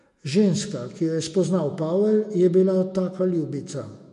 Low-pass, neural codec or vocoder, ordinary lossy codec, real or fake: 14.4 kHz; autoencoder, 48 kHz, 128 numbers a frame, DAC-VAE, trained on Japanese speech; MP3, 48 kbps; fake